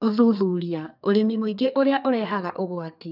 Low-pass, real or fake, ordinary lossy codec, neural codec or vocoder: 5.4 kHz; fake; none; codec, 32 kHz, 1.9 kbps, SNAC